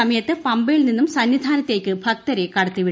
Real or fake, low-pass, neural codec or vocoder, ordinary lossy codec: real; none; none; none